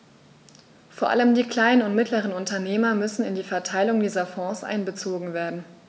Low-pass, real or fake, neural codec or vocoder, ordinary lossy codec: none; real; none; none